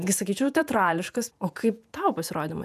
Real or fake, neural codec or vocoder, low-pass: fake; vocoder, 48 kHz, 128 mel bands, Vocos; 14.4 kHz